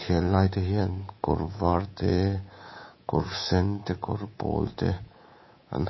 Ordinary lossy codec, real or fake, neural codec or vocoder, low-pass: MP3, 24 kbps; fake; codec, 24 kHz, 3.1 kbps, DualCodec; 7.2 kHz